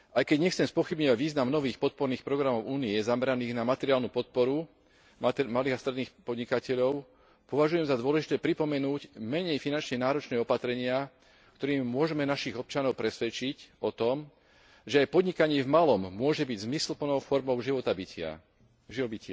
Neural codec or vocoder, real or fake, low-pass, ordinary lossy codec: none; real; none; none